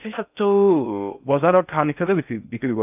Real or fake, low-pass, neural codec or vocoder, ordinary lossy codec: fake; 3.6 kHz; codec, 16 kHz in and 24 kHz out, 0.6 kbps, FocalCodec, streaming, 4096 codes; none